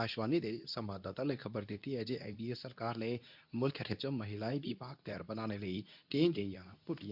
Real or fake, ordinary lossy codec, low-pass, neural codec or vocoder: fake; none; 5.4 kHz; codec, 24 kHz, 0.9 kbps, WavTokenizer, medium speech release version 2